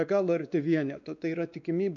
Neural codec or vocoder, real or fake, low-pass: codec, 16 kHz, 2 kbps, X-Codec, WavLM features, trained on Multilingual LibriSpeech; fake; 7.2 kHz